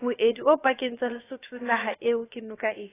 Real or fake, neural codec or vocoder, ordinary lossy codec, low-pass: fake; codec, 16 kHz, about 1 kbps, DyCAST, with the encoder's durations; AAC, 16 kbps; 3.6 kHz